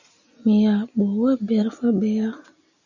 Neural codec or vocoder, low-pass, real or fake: none; 7.2 kHz; real